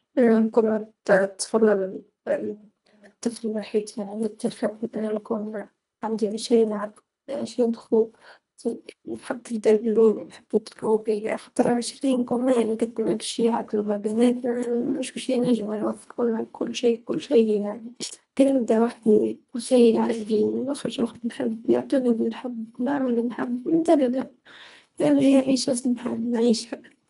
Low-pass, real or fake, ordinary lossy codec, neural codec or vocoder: 10.8 kHz; fake; none; codec, 24 kHz, 1.5 kbps, HILCodec